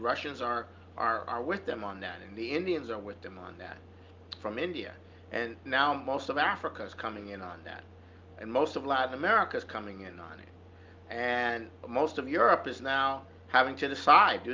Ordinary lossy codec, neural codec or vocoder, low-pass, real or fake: Opus, 24 kbps; none; 7.2 kHz; real